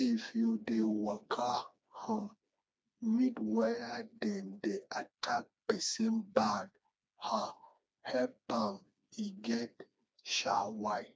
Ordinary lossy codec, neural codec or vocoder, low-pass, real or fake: none; codec, 16 kHz, 2 kbps, FreqCodec, smaller model; none; fake